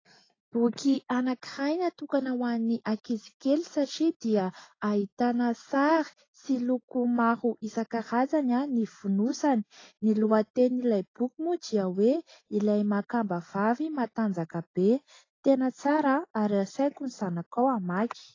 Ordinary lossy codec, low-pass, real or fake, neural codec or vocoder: AAC, 32 kbps; 7.2 kHz; real; none